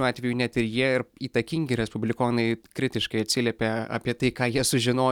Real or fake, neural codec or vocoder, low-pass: real; none; 19.8 kHz